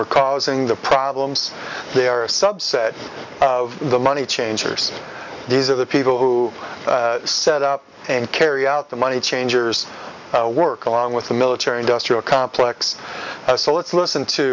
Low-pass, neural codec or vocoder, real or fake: 7.2 kHz; none; real